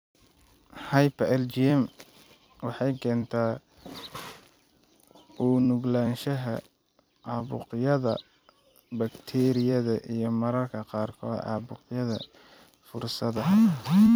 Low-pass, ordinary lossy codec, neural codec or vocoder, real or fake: none; none; vocoder, 44.1 kHz, 128 mel bands every 256 samples, BigVGAN v2; fake